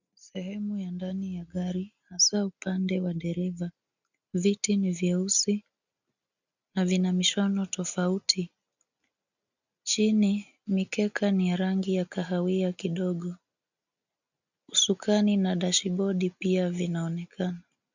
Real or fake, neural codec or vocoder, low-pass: real; none; 7.2 kHz